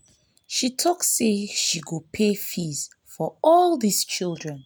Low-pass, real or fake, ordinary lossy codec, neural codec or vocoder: none; real; none; none